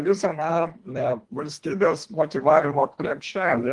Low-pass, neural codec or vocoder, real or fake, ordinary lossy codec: 10.8 kHz; codec, 24 kHz, 1.5 kbps, HILCodec; fake; Opus, 24 kbps